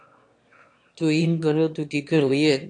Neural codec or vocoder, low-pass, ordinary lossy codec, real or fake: autoencoder, 22.05 kHz, a latent of 192 numbers a frame, VITS, trained on one speaker; 9.9 kHz; AAC, 48 kbps; fake